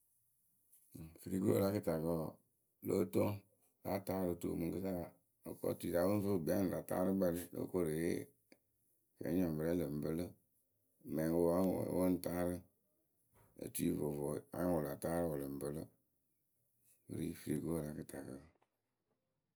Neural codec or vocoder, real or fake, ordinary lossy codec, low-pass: none; real; none; none